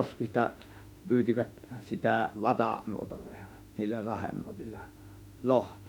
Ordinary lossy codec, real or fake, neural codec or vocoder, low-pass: none; fake; autoencoder, 48 kHz, 32 numbers a frame, DAC-VAE, trained on Japanese speech; 19.8 kHz